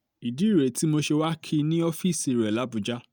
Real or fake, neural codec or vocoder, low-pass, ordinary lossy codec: real; none; none; none